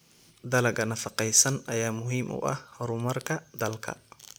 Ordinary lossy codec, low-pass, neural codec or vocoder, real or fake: none; none; none; real